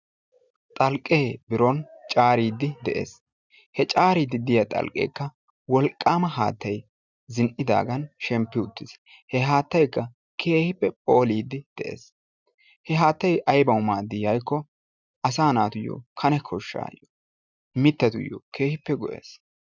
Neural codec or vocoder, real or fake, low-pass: none; real; 7.2 kHz